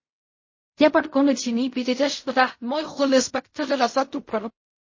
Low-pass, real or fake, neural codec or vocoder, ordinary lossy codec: 7.2 kHz; fake; codec, 16 kHz in and 24 kHz out, 0.4 kbps, LongCat-Audio-Codec, fine tuned four codebook decoder; MP3, 32 kbps